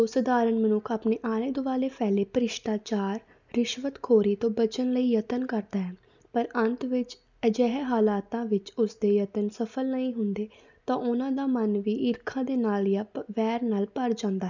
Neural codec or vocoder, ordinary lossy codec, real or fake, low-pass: none; none; real; 7.2 kHz